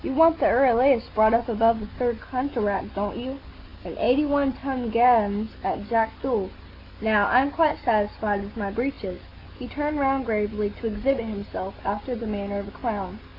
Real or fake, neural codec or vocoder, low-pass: real; none; 5.4 kHz